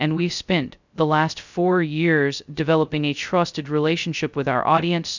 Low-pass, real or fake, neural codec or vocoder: 7.2 kHz; fake; codec, 16 kHz, 0.2 kbps, FocalCodec